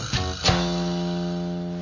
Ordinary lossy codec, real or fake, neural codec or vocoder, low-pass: none; real; none; 7.2 kHz